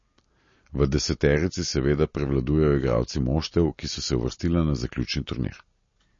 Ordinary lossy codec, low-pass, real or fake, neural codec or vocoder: MP3, 32 kbps; 7.2 kHz; real; none